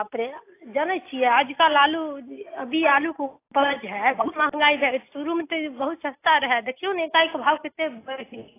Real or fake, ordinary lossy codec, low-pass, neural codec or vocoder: real; AAC, 24 kbps; 3.6 kHz; none